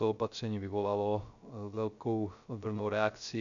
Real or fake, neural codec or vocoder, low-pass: fake; codec, 16 kHz, 0.3 kbps, FocalCodec; 7.2 kHz